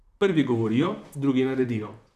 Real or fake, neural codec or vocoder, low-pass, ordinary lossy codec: fake; vocoder, 44.1 kHz, 128 mel bands, Pupu-Vocoder; 14.4 kHz; none